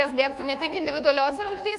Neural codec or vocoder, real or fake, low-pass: codec, 24 kHz, 1.2 kbps, DualCodec; fake; 10.8 kHz